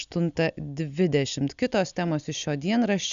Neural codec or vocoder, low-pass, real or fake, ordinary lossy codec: none; 7.2 kHz; real; MP3, 96 kbps